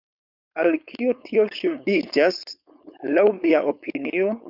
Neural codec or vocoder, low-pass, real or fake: codec, 16 kHz, 4.8 kbps, FACodec; 5.4 kHz; fake